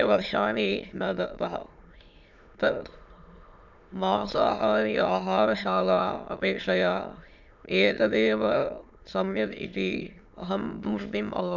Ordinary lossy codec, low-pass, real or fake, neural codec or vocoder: none; 7.2 kHz; fake; autoencoder, 22.05 kHz, a latent of 192 numbers a frame, VITS, trained on many speakers